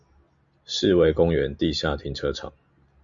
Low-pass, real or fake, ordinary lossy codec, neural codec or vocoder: 7.2 kHz; real; Opus, 64 kbps; none